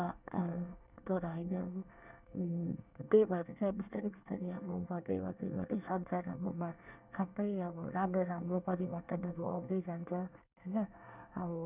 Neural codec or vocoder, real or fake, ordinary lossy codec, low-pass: codec, 24 kHz, 1 kbps, SNAC; fake; none; 3.6 kHz